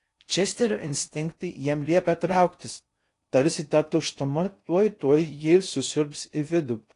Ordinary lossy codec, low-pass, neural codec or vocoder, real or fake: AAC, 48 kbps; 10.8 kHz; codec, 16 kHz in and 24 kHz out, 0.6 kbps, FocalCodec, streaming, 4096 codes; fake